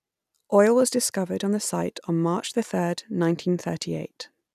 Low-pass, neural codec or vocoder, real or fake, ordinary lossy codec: 14.4 kHz; none; real; none